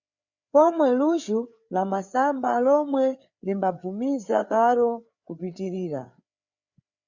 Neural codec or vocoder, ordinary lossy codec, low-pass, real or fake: codec, 16 kHz, 4 kbps, FreqCodec, larger model; Opus, 64 kbps; 7.2 kHz; fake